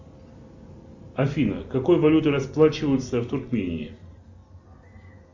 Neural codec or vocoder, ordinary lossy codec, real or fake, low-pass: none; MP3, 64 kbps; real; 7.2 kHz